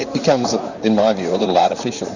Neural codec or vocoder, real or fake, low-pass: vocoder, 44.1 kHz, 128 mel bands, Pupu-Vocoder; fake; 7.2 kHz